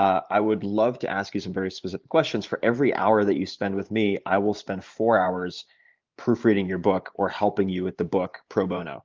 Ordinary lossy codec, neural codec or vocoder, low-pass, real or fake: Opus, 32 kbps; vocoder, 44.1 kHz, 128 mel bands every 512 samples, BigVGAN v2; 7.2 kHz; fake